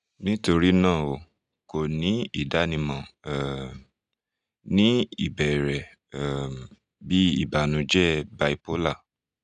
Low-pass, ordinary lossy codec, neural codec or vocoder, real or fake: 10.8 kHz; none; none; real